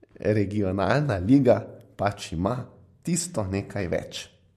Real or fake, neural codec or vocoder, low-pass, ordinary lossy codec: real; none; 14.4 kHz; MP3, 64 kbps